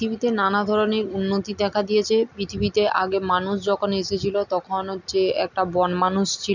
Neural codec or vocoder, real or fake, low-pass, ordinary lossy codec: none; real; 7.2 kHz; none